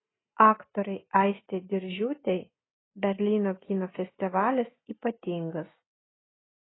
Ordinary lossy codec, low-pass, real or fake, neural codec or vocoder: AAC, 16 kbps; 7.2 kHz; real; none